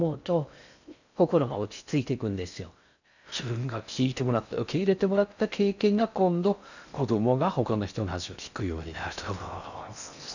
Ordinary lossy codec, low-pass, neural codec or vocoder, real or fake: none; 7.2 kHz; codec, 16 kHz in and 24 kHz out, 0.6 kbps, FocalCodec, streaming, 4096 codes; fake